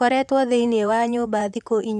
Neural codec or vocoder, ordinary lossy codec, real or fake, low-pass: vocoder, 44.1 kHz, 128 mel bands, Pupu-Vocoder; none; fake; 14.4 kHz